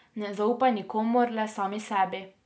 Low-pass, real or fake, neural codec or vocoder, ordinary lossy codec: none; real; none; none